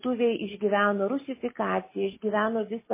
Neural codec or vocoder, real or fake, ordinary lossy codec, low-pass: none; real; MP3, 16 kbps; 3.6 kHz